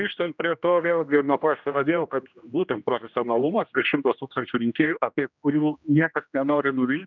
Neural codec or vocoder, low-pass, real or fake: codec, 16 kHz, 1 kbps, X-Codec, HuBERT features, trained on general audio; 7.2 kHz; fake